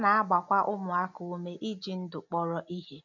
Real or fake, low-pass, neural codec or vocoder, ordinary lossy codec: real; 7.2 kHz; none; none